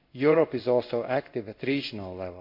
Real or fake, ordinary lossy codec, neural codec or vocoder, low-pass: fake; MP3, 48 kbps; codec, 16 kHz in and 24 kHz out, 1 kbps, XY-Tokenizer; 5.4 kHz